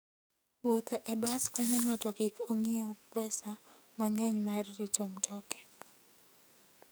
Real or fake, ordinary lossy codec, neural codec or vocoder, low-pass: fake; none; codec, 44.1 kHz, 2.6 kbps, SNAC; none